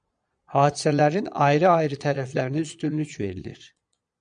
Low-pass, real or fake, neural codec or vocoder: 9.9 kHz; fake; vocoder, 22.05 kHz, 80 mel bands, Vocos